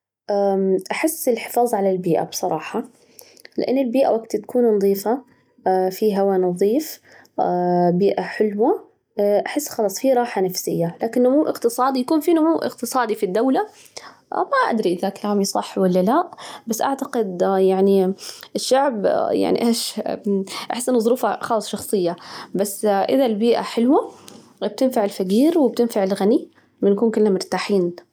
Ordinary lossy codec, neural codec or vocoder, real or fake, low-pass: none; none; real; 19.8 kHz